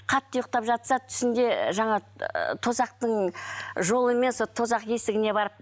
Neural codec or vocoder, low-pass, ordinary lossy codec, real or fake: none; none; none; real